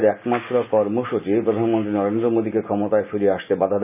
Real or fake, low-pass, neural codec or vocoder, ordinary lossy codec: real; 3.6 kHz; none; none